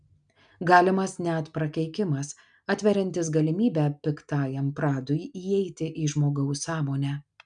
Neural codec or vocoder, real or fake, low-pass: none; real; 9.9 kHz